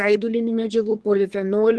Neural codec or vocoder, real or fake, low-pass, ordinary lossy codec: codec, 24 kHz, 1 kbps, SNAC; fake; 10.8 kHz; Opus, 16 kbps